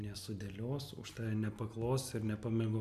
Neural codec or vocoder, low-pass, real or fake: none; 14.4 kHz; real